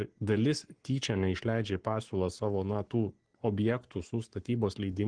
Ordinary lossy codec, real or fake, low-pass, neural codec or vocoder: Opus, 16 kbps; fake; 9.9 kHz; codec, 44.1 kHz, 7.8 kbps, Pupu-Codec